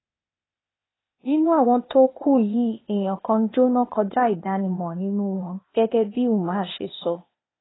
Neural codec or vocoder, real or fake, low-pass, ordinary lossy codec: codec, 16 kHz, 0.8 kbps, ZipCodec; fake; 7.2 kHz; AAC, 16 kbps